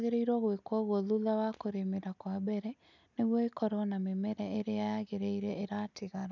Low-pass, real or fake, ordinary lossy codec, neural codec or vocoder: 7.2 kHz; real; none; none